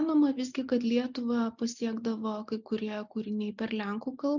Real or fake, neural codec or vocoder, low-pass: real; none; 7.2 kHz